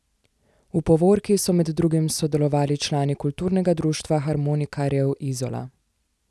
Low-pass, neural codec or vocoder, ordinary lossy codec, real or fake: none; none; none; real